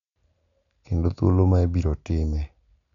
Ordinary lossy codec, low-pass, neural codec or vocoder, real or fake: none; 7.2 kHz; none; real